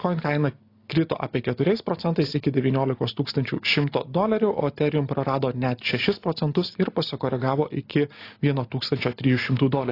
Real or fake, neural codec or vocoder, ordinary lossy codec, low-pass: real; none; AAC, 32 kbps; 5.4 kHz